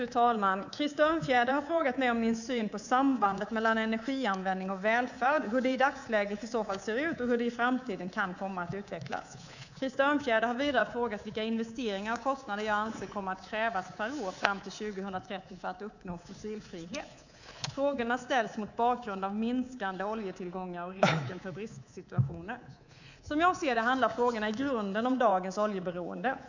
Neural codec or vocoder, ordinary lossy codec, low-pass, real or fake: codec, 24 kHz, 3.1 kbps, DualCodec; none; 7.2 kHz; fake